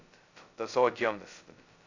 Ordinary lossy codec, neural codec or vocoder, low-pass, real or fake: AAC, 48 kbps; codec, 16 kHz, 0.2 kbps, FocalCodec; 7.2 kHz; fake